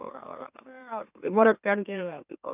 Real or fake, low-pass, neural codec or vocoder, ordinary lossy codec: fake; 3.6 kHz; autoencoder, 44.1 kHz, a latent of 192 numbers a frame, MeloTTS; none